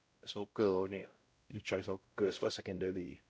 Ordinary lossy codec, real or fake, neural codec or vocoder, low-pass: none; fake; codec, 16 kHz, 0.5 kbps, X-Codec, WavLM features, trained on Multilingual LibriSpeech; none